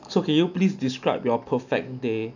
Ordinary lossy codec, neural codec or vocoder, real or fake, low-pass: none; none; real; 7.2 kHz